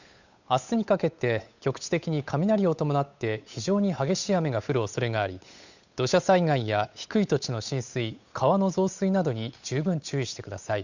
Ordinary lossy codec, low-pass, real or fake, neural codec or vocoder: none; 7.2 kHz; fake; codec, 16 kHz, 8 kbps, FunCodec, trained on Chinese and English, 25 frames a second